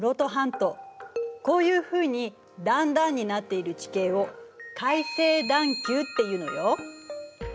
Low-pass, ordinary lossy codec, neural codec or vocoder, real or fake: none; none; none; real